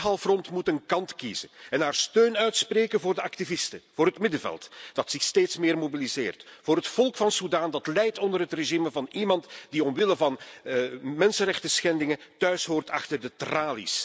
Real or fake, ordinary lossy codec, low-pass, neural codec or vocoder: real; none; none; none